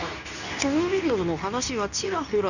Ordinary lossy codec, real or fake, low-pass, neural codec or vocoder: none; fake; 7.2 kHz; codec, 24 kHz, 0.9 kbps, WavTokenizer, medium speech release version 2